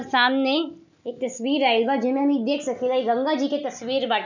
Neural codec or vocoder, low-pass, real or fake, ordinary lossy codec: none; 7.2 kHz; real; none